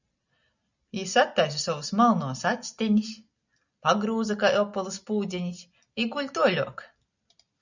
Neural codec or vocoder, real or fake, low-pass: none; real; 7.2 kHz